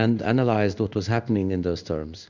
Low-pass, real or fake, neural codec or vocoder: 7.2 kHz; fake; codec, 16 kHz in and 24 kHz out, 1 kbps, XY-Tokenizer